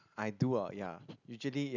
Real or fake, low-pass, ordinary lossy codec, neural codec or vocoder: real; 7.2 kHz; none; none